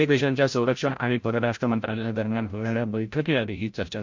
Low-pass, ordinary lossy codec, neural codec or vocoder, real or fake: 7.2 kHz; MP3, 48 kbps; codec, 16 kHz, 0.5 kbps, FreqCodec, larger model; fake